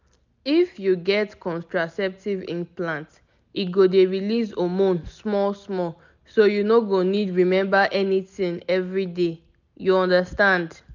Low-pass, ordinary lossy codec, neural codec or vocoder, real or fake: 7.2 kHz; none; none; real